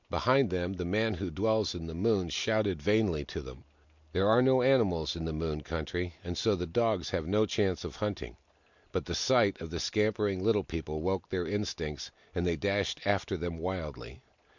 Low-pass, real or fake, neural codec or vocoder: 7.2 kHz; real; none